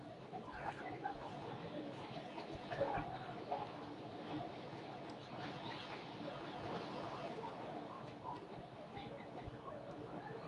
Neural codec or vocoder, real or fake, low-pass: codec, 24 kHz, 0.9 kbps, WavTokenizer, medium speech release version 2; fake; 10.8 kHz